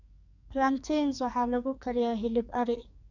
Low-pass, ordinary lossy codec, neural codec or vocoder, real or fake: 7.2 kHz; none; codec, 44.1 kHz, 2.6 kbps, SNAC; fake